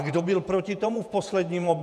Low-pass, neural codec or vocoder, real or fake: 14.4 kHz; vocoder, 48 kHz, 128 mel bands, Vocos; fake